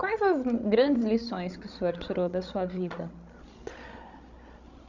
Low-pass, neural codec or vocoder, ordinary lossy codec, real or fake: 7.2 kHz; codec, 16 kHz, 16 kbps, FreqCodec, larger model; none; fake